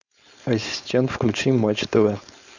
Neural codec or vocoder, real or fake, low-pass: codec, 16 kHz, 4.8 kbps, FACodec; fake; 7.2 kHz